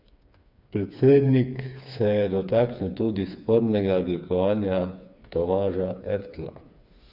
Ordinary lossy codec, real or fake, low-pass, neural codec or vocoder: none; fake; 5.4 kHz; codec, 16 kHz, 4 kbps, FreqCodec, smaller model